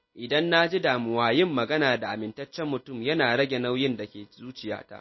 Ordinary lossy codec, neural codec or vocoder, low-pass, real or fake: MP3, 24 kbps; none; 7.2 kHz; real